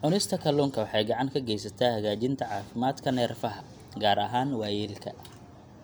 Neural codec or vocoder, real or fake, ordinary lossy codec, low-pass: none; real; none; none